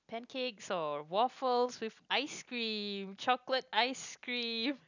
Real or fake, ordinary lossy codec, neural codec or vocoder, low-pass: real; none; none; 7.2 kHz